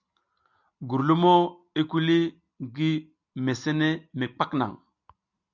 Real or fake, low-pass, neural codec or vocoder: real; 7.2 kHz; none